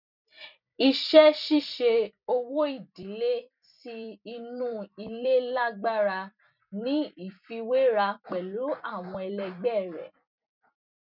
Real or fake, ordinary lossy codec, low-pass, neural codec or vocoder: fake; none; 5.4 kHz; vocoder, 44.1 kHz, 128 mel bands every 256 samples, BigVGAN v2